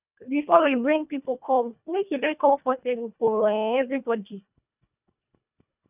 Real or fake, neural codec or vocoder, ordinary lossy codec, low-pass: fake; codec, 24 kHz, 1.5 kbps, HILCodec; none; 3.6 kHz